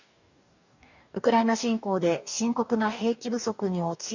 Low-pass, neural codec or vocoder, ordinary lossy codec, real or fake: 7.2 kHz; codec, 44.1 kHz, 2.6 kbps, DAC; none; fake